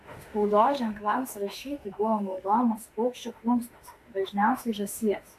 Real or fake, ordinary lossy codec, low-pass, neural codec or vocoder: fake; AAC, 64 kbps; 14.4 kHz; autoencoder, 48 kHz, 32 numbers a frame, DAC-VAE, trained on Japanese speech